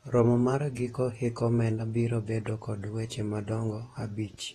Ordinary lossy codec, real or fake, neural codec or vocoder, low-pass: AAC, 32 kbps; fake; vocoder, 48 kHz, 128 mel bands, Vocos; 19.8 kHz